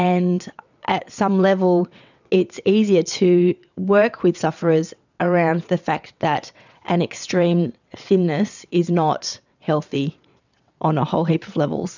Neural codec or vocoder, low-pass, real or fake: vocoder, 22.05 kHz, 80 mel bands, WaveNeXt; 7.2 kHz; fake